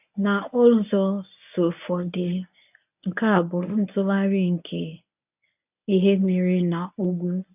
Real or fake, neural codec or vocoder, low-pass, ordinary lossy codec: fake; codec, 24 kHz, 0.9 kbps, WavTokenizer, medium speech release version 1; 3.6 kHz; none